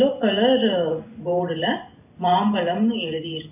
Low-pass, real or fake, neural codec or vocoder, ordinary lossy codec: 3.6 kHz; real; none; AAC, 32 kbps